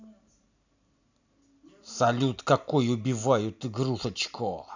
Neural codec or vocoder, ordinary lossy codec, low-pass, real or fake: none; none; 7.2 kHz; real